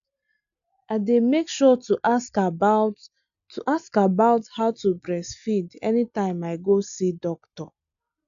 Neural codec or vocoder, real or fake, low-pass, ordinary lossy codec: none; real; 7.2 kHz; AAC, 96 kbps